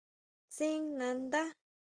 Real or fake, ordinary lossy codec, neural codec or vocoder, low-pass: real; Opus, 24 kbps; none; 9.9 kHz